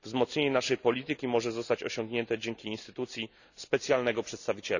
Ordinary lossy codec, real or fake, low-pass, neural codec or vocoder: none; real; 7.2 kHz; none